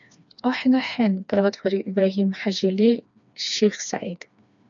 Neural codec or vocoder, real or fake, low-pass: codec, 16 kHz, 2 kbps, FreqCodec, smaller model; fake; 7.2 kHz